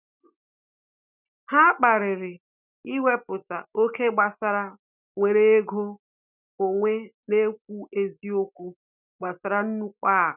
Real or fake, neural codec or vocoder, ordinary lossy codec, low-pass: real; none; none; 3.6 kHz